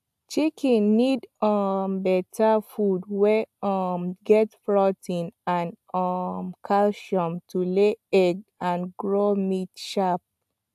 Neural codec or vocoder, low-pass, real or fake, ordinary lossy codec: none; 14.4 kHz; real; AAC, 96 kbps